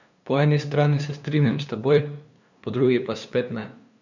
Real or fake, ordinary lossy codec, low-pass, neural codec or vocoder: fake; none; 7.2 kHz; codec, 16 kHz, 2 kbps, FunCodec, trained on LibriTTS, 25 frames a second